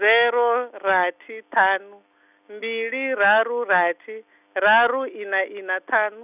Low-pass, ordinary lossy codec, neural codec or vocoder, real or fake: 3.6 kHz; none; none; real